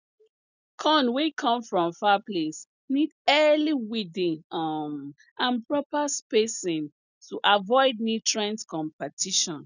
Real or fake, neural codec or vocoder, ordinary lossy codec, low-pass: real; none; none; 7.2 kHz